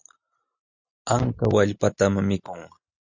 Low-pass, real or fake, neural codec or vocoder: 7.2 kHz; real; none